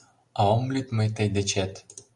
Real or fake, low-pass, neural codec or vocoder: real; 10.8 kHz; none